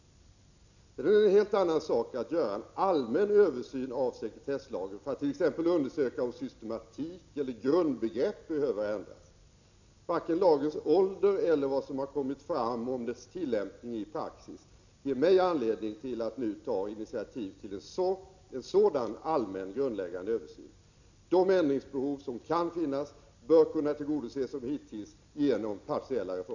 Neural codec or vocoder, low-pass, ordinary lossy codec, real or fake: none; 7.2 kHz; none; real